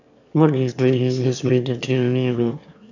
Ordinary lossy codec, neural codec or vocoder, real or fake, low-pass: none; autoencoder, 22.05 kHz, a latent of 192 numbers a frame, VITS, trained on one speaker; fake; 7.2 kHz